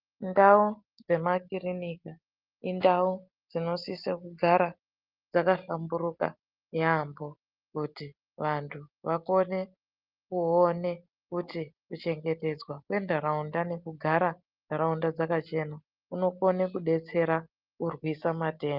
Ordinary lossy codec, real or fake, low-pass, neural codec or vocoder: Opus, 32 kbps; real; 5.4 kHz; none